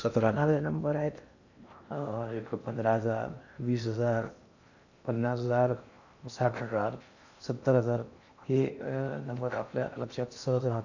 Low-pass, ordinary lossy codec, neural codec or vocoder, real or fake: 7.2 kHz; none; codec, 16 kHz in and 24 kHz out, 0.6 kbps, FocalCodec, streaming, 2048 codes; fake